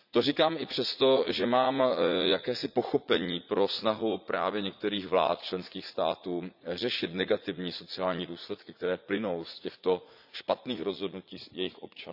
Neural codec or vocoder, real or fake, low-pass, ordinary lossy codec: vocoder, 44.1 kHz, 80 mel bands, Vocos; fake; 5.4 kHz; none